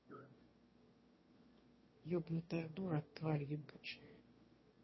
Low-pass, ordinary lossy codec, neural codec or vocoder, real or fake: 7.2 kHz; MP3, 24 kbps; autoencoder, 22.05 kHz, a latent of 192 numbers a frame, VITS, trained on one speaker; fake